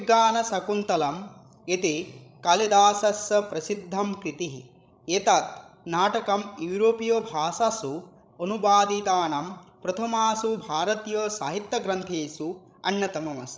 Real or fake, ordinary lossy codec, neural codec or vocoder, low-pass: fake; none; codec, 16 kHz, 16 kbps, FreqCodec, larger model; none